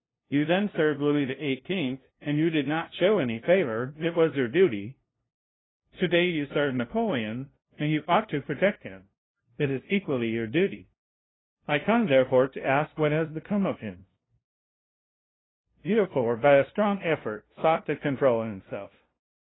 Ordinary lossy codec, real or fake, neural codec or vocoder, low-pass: AAC, 16 kbps; fake; codec, 16 kHz, 0.5 kbps, FunCodec, trained on LibriTTS, 25 frames a second; 7.2 kHz